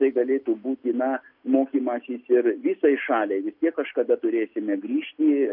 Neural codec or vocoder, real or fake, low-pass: none; real; 5.4 kHz